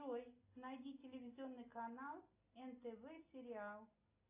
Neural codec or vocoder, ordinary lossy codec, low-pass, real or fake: none; AAC, 32 kbps; 3.6 kHz; real